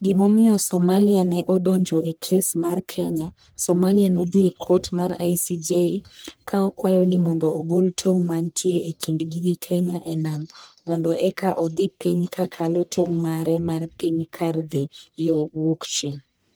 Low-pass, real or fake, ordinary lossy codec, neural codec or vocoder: none; fake; none; codec, 44.1 kHz, 1.7 kbps, Pupu-Codec